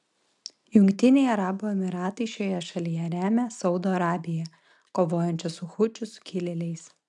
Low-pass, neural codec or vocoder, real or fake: 10.8 kHz; none; real